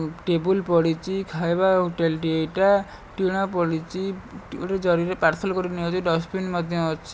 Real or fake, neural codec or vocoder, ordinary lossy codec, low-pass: real; none; none; none